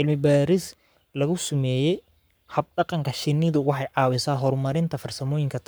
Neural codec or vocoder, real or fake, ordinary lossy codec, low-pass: codec, 44.1 kHz, 7.8 kbps, Pupu-Codec; fake; none; none